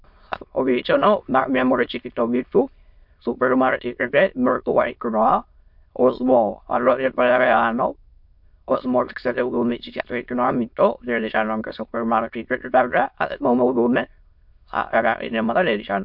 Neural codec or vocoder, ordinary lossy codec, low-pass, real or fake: autoencoder, 22.05 kHz, a latent of 192 numbers a frame, VITS, trained on many speakers; MP3, 48 kbps; 5.4 kHz; fake